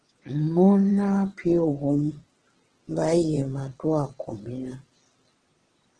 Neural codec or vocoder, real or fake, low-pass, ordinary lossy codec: vocoder, 22.05 kHz, 80 mel bands, WaveNeXt; fake; 9.9 kHz; Opus, 16 kbps